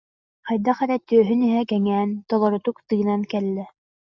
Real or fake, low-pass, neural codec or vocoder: real; 7.2 kHz; none